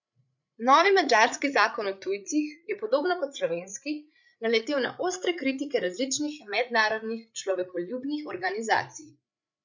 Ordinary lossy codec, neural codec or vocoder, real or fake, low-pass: none; codec, 16 kHz, 8 kbps, FreqCodec, larger model; fake; 7.2 kHz